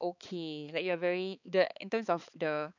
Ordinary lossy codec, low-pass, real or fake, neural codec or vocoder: none; 7.2 kHz; fake; codec, 16 kHz, 4 kbps, X-Codec, HuBERT features, trained on balanced general audio